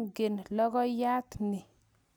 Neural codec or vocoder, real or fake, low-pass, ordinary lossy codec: none; real; none; none